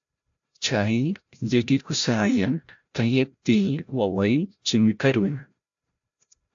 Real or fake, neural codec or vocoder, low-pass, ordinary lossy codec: fake; codec, 16 kHz, 0.5 kbps, FreqCodec, larger model; 7.2 kHz; AAC, 64 kbps